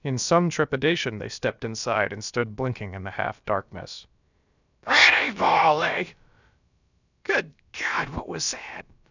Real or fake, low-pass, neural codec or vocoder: fake; 7.2 kHz; codec, 16 kHz, about 1 kbps, DyCAST, with the encoder's durations